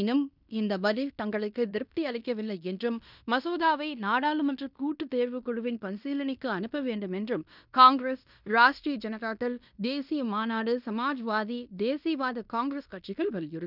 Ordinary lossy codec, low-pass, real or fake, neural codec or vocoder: none; 5.4 kHz; fake; codec, 16 kHz in and 24 kHz out, 0.9 kbps, LongCat-Audio-Codec, fine tuned four codebook decoder